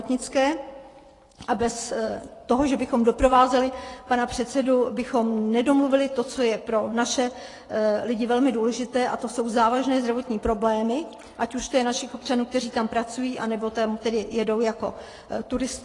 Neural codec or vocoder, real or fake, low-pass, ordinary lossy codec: vocoder, 24 kHz, 100 mel bands, Vocos; fake; 10.8 kHz; AAC, 32 kbps